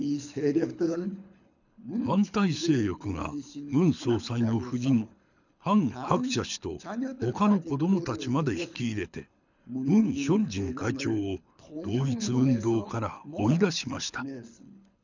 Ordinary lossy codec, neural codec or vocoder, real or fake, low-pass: none; codec, 24 kHz, 6 kbps, HILCodec; fake; 7.2 kHz